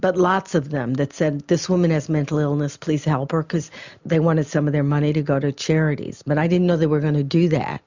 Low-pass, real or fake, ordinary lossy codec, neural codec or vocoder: 7.2 kHz; real; Opus, 64 kbps; none